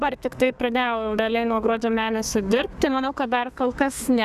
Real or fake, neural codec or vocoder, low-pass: fake; codec, 32 kHz, 1.9 kbps, SNAC; 14.4 kHz